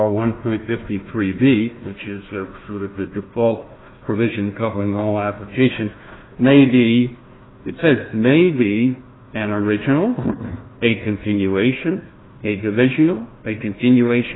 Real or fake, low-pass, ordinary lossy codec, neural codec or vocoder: fake; 7.2 kHz; AAC, 16 kbps; codec, 16 kHz, 1 kbps, FunCodec, trained on Chinese and English, 50 frames a second